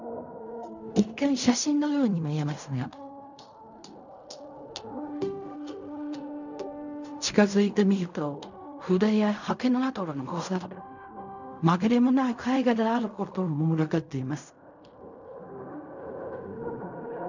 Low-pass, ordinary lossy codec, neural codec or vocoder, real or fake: 7.2 kHz; none; codec, 16 kHz in and 24 kHz out, 0.4 kbps, LongCat-Audio-Codec, fine tuned four codebook decoder; fake